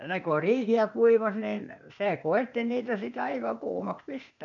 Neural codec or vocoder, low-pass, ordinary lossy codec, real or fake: codec, 16 kHz, 0.8 kbps, ZipCodec; 7.2 kHz; none; fake